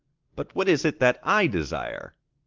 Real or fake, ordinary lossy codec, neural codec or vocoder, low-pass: real; Opus, 32 kbps; none; 7.2 kHz